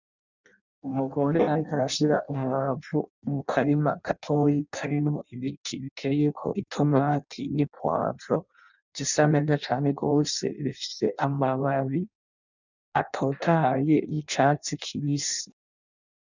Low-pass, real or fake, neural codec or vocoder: 7.2 kHz; fake; codec, 16 kHz in and 24 kHz out, 0.6 kbps, FireRedTTS-2 codec